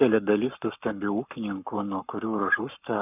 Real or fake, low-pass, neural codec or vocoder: fake; 3.6 kHz; codec, 44.1 kHz, 7.8 kbps, Pupu-Codec